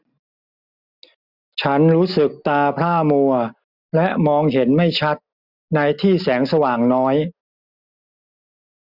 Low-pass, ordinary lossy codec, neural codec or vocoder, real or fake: 5.4 kHz; none; none; real